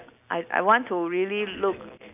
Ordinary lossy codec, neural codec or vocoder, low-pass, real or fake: none; none; 3.6 kHz; real